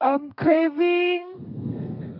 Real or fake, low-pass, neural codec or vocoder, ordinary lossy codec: fake; 5.4 kHz; codec, 32 kHz, 1.9 kbps, SNAC; none